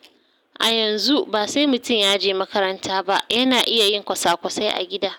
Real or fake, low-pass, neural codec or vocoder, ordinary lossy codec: real; 19.8 kHz; none; none